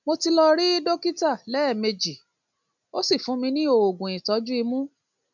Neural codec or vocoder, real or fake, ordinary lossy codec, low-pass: none; real; none; 7.2 kHz